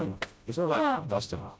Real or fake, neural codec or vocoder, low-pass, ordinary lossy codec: fake; codec, 16 kHz, 0.5 kbps, FreqCodec, smaller model; none; none